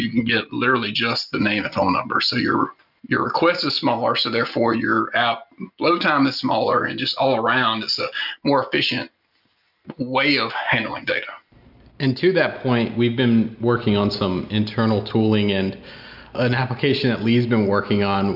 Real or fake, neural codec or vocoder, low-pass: real; none; 5.4 kHz